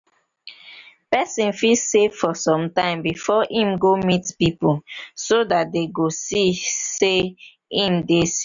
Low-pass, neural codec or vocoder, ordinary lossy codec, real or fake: 7.2 kHz; none; none; real